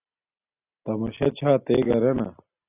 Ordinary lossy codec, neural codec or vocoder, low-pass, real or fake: AAC, 24 kbps; none; 3.6 kHz; real